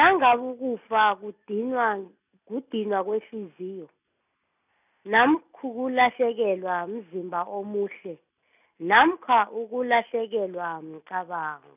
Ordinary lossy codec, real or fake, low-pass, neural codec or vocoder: none; real; 3.6 kHz; none